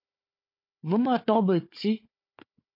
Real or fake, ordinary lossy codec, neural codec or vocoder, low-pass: fake; MP3, 32 kbps; codec, 16 kHz, 4 kbps, FunCodec, trained on Chinese and English, 50 frames a second; 5.4 kHz